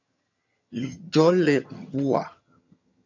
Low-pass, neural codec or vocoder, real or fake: 7.2 kHz; vocoder, 22.05 kHz, 80 mel bands, HiFi-GAN; fake